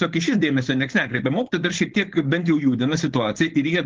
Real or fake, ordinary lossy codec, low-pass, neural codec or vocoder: fake; Opus, 16 kbps; 7.2 kHz; codec, 16 kHz, 4.8 kbps, FACodec